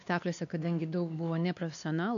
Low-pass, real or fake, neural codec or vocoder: 7.2 kHz; fake; codec, 16 kHz, 2 kbps, X-Codec, WavLM features, trained on Multilingual LibriSpeech